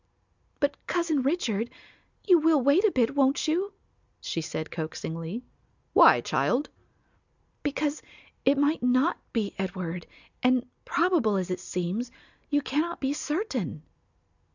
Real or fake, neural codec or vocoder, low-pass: real; none; 7.2 kHz